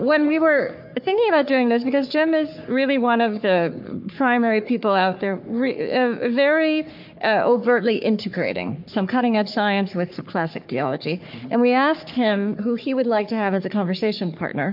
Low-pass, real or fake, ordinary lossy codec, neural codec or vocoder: 5.4 kHz; fake; MP3, 48 kbps; codec, 44.1 kHz, 3.4 kbps, Pupu-Codec